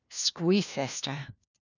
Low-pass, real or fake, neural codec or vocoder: 7.2 kHz; fake; codec, 16 kHz, 1 kbps, FunCodec, trained on LibriTTS, 50 frames a second